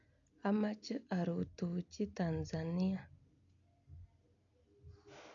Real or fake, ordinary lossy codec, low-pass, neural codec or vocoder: real; none; 7.2 kHz; none